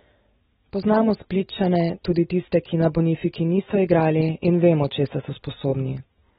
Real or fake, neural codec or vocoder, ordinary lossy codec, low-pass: real; none; AAC, 16 kbps; 19.8 kHz